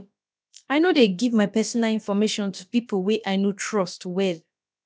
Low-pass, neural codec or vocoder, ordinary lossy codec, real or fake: none; codec, 16 kHz, about 1 kbps, DyCAST, with the encoder's durations; none; fake